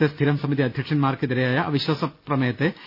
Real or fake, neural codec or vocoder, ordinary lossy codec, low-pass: real; none; none; 5.4 kHz